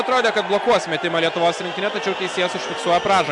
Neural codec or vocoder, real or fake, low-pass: none; real; 10.8 kHz